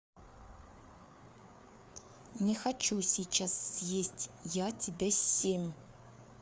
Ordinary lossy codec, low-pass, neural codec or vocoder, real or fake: none; none; codec, 16 kHz, 4 kbps, FreqCodec, larger model; fake